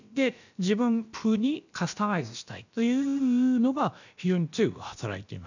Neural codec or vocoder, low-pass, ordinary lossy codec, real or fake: codec, 16 kHz, about 1 kbps, DyCAST, with the encoder's durations; 7.2 kHz; none; fake